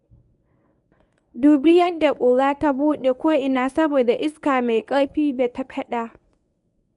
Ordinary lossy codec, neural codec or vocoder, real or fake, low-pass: none; codec, 24 kHz, 0.9 kbps, WavTokenizer, medium speech release version 1; fake; 10.8 kHz